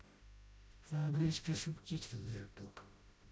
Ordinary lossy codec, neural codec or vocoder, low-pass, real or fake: none; codec, 16 kHz, 0.5 kbps, FreqCodec, smaller model; none; fake